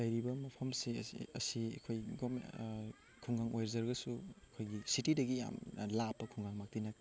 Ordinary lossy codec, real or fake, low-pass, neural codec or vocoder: none; real; none; none